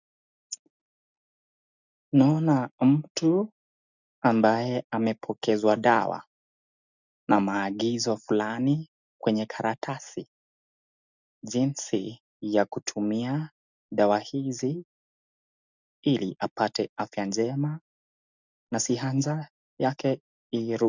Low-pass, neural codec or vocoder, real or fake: 7.2 kHz; none; real